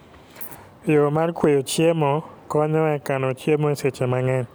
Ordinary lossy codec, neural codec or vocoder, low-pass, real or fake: none; none; none; real